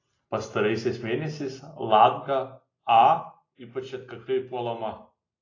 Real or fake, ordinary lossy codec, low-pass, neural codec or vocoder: real; AAC, 32 kbps; 7.2 kHz; none